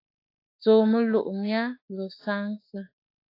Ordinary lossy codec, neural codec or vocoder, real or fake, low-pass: AAC, 32 kbps; autoencoder, 48 kHz, 32 numbers a frame, DAC-VAE, trained on Japanese speech; fake; 5.4 kHz